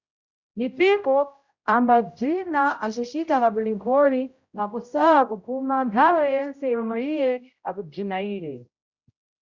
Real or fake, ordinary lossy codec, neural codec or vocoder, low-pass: fake; Opus, 64 kbps; codec, 16 kHz, 0.5 kbps, X-Codec, HuBERT features, trained on general audio; 7.2 kHz